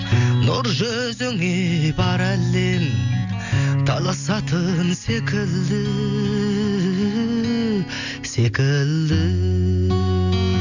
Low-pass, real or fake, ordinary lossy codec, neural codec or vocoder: 7.2 kHz; real; none; none